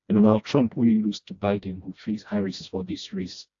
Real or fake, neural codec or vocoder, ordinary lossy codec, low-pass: fake; codec, 16 kHz, 1 kbps, FreqCodec, smaller model; AAC, 48 kbps; 7.2 kHz